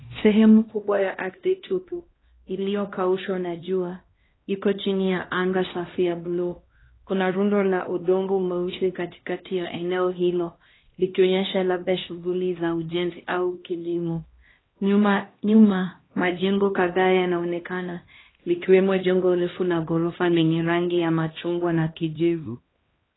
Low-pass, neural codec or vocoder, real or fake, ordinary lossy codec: 7.2 kHz; codec, 16 kHz, 1 kbps, X-Codec, HuBERT features, trained on LibriSpeech; fake; AAC, 16 kbps